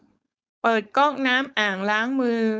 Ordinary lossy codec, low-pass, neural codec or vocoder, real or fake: none; none; codec, 16 kHz, 4.8 kbps, FACodec; fake